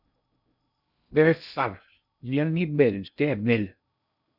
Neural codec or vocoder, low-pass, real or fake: codec, 16 kHz in and 24 kHz out, 0.6 kbps, FocalCodec, streaming, 2048 codes; 5.4 kHz; fake